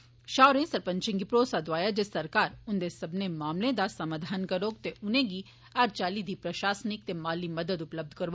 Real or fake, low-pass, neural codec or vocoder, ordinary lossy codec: real; none; none; none